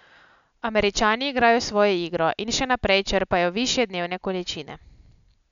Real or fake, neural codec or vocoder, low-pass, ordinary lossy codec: real; none; 7.2 kHz; none